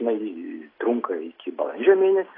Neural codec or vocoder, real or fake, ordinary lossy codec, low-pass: none; real; AAC, 24 kbps; 5.4 kHz